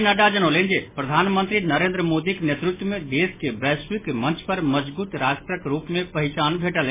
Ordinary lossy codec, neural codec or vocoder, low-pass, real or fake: MP3, 16 kbps; none; 3.6 kHz; real